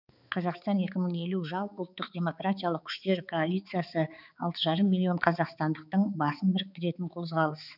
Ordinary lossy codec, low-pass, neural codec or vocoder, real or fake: none; 5.4 kHz; codec, 16 kHz, 4 kbps, X-Codec, HuBERT features, trained on balanced general audio; fake